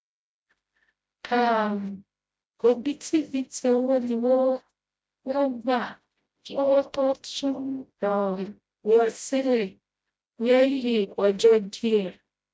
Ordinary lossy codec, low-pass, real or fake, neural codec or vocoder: none; none; fake; codec, 16 kHz, 0.5 kbps, FreqCodec, smaller model